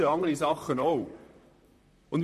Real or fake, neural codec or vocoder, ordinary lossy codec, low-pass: fake; vocoder, 44.1 kHz, 128 mel bands, Pupu-Vocoder; MP3, 64 kbps; 14.4 kHz